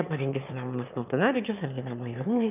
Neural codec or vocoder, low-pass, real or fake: autoencoder, 22.05 kHz, a latent of 192 numbers a frame, VITS, trained on one speaker; 3.6 kHz; fake